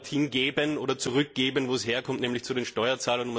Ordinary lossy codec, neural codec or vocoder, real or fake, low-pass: none; none; real; none